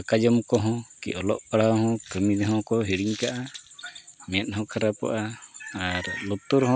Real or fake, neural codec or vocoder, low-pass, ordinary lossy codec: real; none; none; none